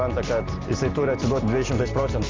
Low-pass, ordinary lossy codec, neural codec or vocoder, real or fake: 7.2 kHz; Opus, 32 kbps; none; real